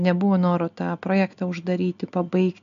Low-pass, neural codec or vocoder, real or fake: 7.2 kHz; none; real